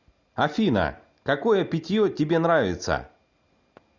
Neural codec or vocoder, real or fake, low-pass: none; real; 7.2 kHz